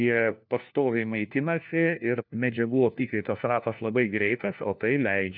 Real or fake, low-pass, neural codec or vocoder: fake; 5.4 kHz; codec, 16 kHz, 1 kbps, FunCodec, trained on LibriTTS, 50 frames a second